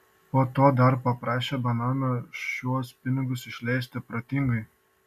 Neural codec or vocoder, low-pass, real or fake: none; 14.4 kHz; real